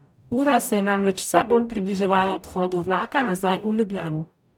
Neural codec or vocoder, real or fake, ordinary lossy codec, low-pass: codec, 44.1 kHz, 0.9 kbps, DAC; fake; none; 19.8 kHz